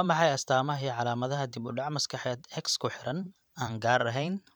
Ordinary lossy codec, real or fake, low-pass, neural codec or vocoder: none; fake; none; vocoder, 44.1 kHz, 128 mel bands every 512 samples, BigVGAN v2